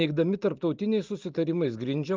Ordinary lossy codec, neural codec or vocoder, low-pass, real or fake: Opus, 32 kbps; none; 7.2 kHz; real